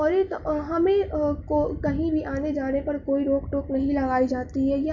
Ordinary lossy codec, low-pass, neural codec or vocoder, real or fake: MP3, 48 kbps; 7.2 kHz; none; real